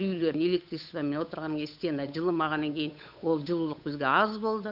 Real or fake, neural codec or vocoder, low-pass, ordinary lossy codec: fake; codec, 16 kHz, 8 kbps, FunCodec, trained on Chinese and English, 25 frames a second; 5.4 kHz; none